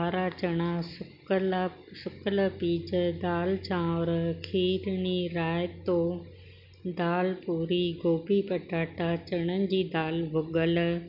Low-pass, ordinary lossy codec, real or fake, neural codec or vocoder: 5.4 kHz; none; real; none